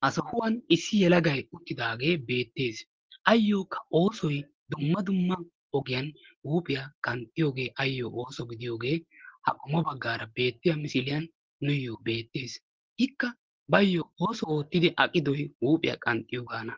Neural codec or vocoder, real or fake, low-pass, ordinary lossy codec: none; real; 7.2 kHz; Opus, 16 kbps